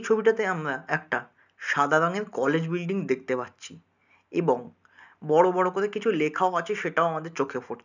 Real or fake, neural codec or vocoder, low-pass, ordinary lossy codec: real; none; 7.2 kHz; none